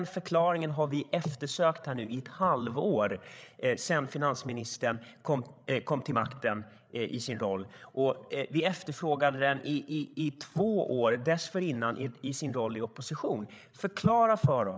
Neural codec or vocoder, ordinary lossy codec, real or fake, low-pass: codec, 16 kHz, 8 kbps, FreqCodec, larger model; none; fake; none